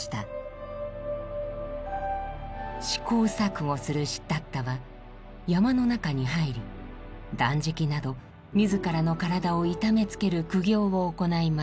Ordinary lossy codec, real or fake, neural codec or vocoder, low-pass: none; real; none; none